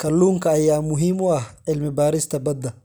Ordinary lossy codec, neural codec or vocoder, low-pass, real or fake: none; none; none; real